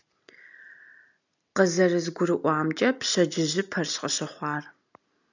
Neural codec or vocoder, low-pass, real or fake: none; 7.2 kHz; real